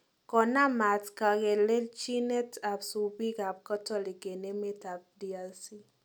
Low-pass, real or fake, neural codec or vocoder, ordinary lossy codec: none; real; none; none